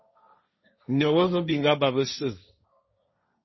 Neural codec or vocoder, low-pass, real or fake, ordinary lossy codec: codec, 16 kHz, 1.1 kbps, Voila-Tokenizer; 7.2 kHz; fake; MP3, 24 kbps